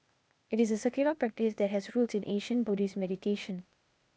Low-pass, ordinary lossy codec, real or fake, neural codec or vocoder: none; none; fake; codec, 16 kHz, 0.8 kbps, ZipCodec